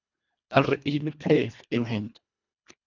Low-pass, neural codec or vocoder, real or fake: 7.2 kHz; codec, 24 kHz, 1.5 kbps, HILCodec; fake